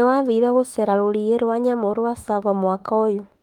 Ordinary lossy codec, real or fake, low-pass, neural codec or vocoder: Opus, 24 kbps; fake; 19.8 kHz; autoencoder, 48 kHz, 32 numbers a frame, DAC-VAE, trained on Japanese speech